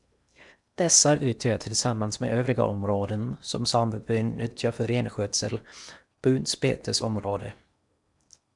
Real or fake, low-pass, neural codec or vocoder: fake; 10.8 kHz; codec, 16 kHz in and 24 kHz out, 0.8 kbps, FocalCodec, streaming, 65536 codes